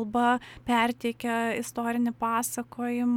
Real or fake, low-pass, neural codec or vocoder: real; 19.8 kHz; none